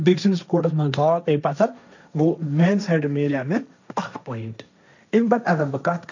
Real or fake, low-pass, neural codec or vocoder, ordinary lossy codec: fake; 7.2 kHz; codec, 16 kHz, 1.1 kbps, Voila-Tokenizer; none